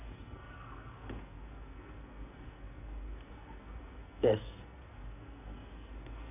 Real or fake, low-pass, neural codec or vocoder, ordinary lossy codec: fake; 3.6 kHz; codec, 44.1 kHz, 2.6 kbps, SNAC; none